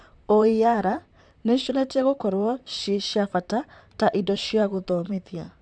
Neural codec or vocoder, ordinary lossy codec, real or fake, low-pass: vocoder, 44.1 kHz, 128 mel bands, Pupu-Vocoder; none; fake; 9.9 kHz